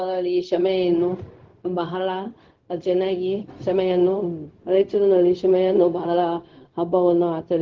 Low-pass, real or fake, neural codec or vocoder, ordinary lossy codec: 7.2 kHz; fake; codec, 16 kHz, 0.4 kbps, LongCat-Audio-Codec; Opus, 16 kbps